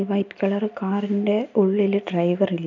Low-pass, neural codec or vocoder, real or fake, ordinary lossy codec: 7.2 kHz; none; real; none